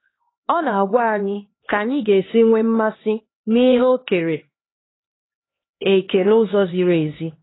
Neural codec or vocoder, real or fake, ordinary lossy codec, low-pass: codec, 16 kHz, 2 kbps, X-Codec, HuBERT features, trained on LibriSpeech; fake; AAC, 16 kbps; 7.2 kHz